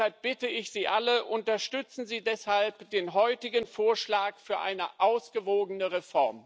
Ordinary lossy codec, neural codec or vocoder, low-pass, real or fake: none; none; none; real